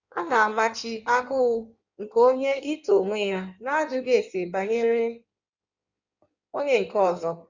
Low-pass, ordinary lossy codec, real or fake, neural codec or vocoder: 7.2 kHz; Opus, 64 kbps; fake; codec, 16 kHz in and 24 kHz out, 1.1 kbps, FireRedTTS-2 codec